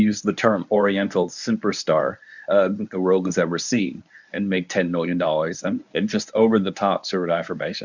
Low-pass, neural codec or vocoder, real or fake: 7.2 kHz; codec, 24 kHz, 0.9 kbps, WavTokenizer, medium speech release version 1; fake